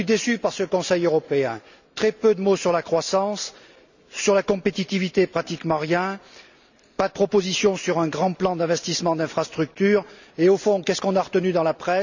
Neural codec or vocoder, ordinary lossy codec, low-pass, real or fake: none; none; 7.2 kHz; real